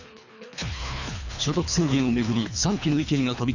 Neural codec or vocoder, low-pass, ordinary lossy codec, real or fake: codec, 24 kHz, 3 kbps, HILCodec; 7.2 kHz; none; fake